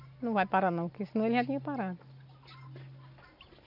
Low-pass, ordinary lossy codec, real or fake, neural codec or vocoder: 5.4 kHz; none; real; none